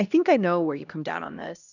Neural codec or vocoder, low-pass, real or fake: codec, 16 kHz, 1 kbps, X-Codec, HuBERT features, trained on LibriSpeech; 7.2 kHz; fake